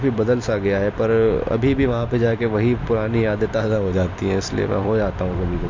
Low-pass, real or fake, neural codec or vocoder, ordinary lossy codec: 7.2 kHz; fake; vocoder, 44.1 kHz, 128 mel bands every 256 samples, BigVGAN v2; MP3, 48 kbps